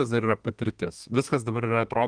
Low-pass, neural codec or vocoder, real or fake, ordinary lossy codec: 9.9 kHz; codec, 32 kHz, 1.9 kbps, SNAC; fake; Opus, 32 kbps